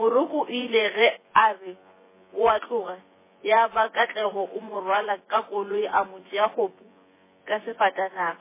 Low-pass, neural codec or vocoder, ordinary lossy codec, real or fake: 3.6 kHz; vocoder, 24 kHz, 100 mel bands, Vocos; MP3, 16 kbps; fake